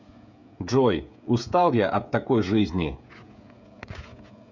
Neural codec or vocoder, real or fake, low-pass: codec, 16 kHz, 16 kbps, FreqCodec, smaller model; fake; 7.2 kHz